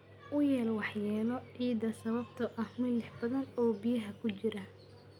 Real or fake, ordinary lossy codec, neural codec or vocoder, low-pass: real; none; none; 19.8 kHz